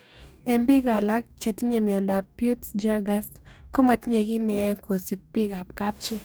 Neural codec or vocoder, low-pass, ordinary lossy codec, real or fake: codec, 44.1 kHz, 2.6 kbps, DAC; none; none; fake